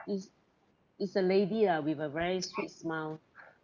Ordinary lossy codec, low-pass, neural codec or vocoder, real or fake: none; 7.2 kHz; none; real